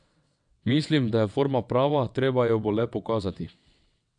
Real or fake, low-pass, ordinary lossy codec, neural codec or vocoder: fake; 9.9 kHz; none; vocoder, 22.05 kHz, 80 mel bands, WaveNeXt